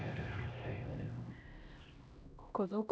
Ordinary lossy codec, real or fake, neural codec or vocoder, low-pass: none; fake; codec, 16 kHz, 1 kbps, X-Codec, HuBERT features, trained on LibriSpeech; none